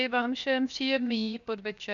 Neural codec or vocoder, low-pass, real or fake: codec, 16 kHz, 0.7 kbps, FocalCodec; 7.2 kHz; fake